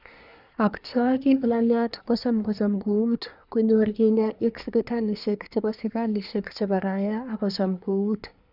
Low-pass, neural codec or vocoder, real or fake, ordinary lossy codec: 5.4 kHz; codec, 24 kHz, 1 kbps, SNAC; fake; none